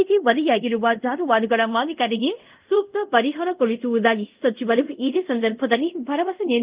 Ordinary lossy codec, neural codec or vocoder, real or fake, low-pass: Opus, 32 kbps; codec, 24 kHz, 0.5 kbps, DualCodec; fake; 3.6 kHz